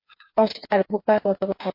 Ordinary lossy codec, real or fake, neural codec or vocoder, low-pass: MP3, 32 kbps; fake; codec, 16 kHz, 4 kbps, FreqCodec, smaller model; 5.4 kHz